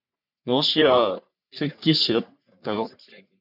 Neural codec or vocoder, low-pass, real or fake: codec, 44.1 kHz, 3.4 kbps, Pupu-Codec; 5.4 kHz; fake